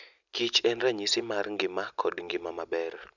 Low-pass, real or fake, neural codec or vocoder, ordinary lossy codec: 7.2 kHz; real; none; none